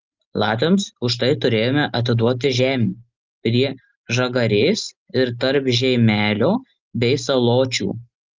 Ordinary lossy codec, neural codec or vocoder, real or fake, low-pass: Opus, 24 kbps; none; real; 7.2 kHz